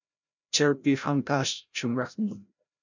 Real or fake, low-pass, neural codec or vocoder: fake; 7.2 kHz; codec, 16 kHz, 0.5 kbps, FreqCodec, larger model